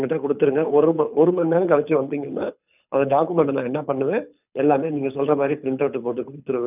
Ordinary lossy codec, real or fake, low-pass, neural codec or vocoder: none; fake; 3.6 kHz; codec, 24 kHz, 6 kbps, HILCodec